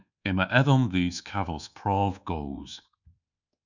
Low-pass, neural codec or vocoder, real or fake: 7.2 kHz; codec, 24 kHz, 1.2 kbps, DualCodec; fake